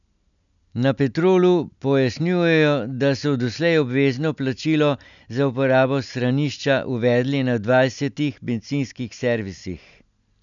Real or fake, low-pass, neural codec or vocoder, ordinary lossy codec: real; 7.2 kHz; none; none